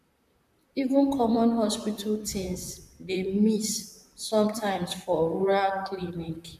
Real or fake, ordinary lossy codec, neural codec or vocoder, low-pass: fake; none; vocoder, 44.1 kHz, 128 mel bands, Pupu-Vocoder; 14.4 kHz